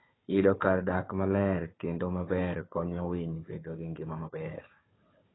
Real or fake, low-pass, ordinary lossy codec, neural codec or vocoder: fake; 7.2 kHz; AAC, 16 kbps; codec, 16 kHz, 4 kbps, FunCodec, trained on Chinese and English, 50 frames a second